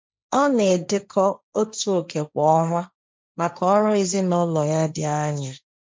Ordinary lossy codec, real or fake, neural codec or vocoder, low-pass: none; fake; codec, 16 kHz, 1.1 kbps, Voila-Tokenizer; none